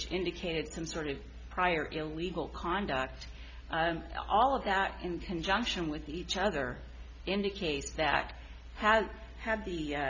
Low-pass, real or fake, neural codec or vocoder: 7.2 kHz; real; none